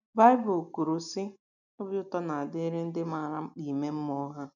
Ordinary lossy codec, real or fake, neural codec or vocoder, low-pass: none; real; none; 7.2 kHz